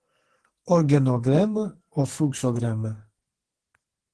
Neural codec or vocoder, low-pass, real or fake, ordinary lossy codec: codec, 44.1 kHz, 2.6 kbps, SNAC; 10.8 kHz; fake; Opus, 16 kbps